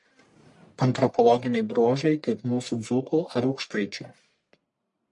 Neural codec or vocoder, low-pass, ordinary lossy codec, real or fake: codec, 44.1 kHz, 1.7 kbps, Pupu-Codec; 10.8 kHz; MP3, 48 kbps; fake